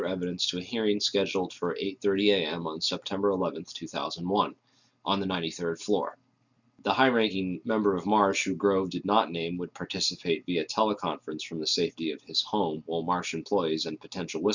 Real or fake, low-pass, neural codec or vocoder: real; 7.2 kHz; none